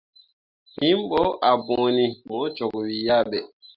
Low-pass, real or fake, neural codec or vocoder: 5.4 kHz; real; none